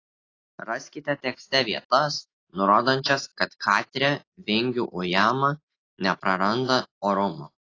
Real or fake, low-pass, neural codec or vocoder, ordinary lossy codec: real; 7.2 kHz; none; AAC, 32 kbps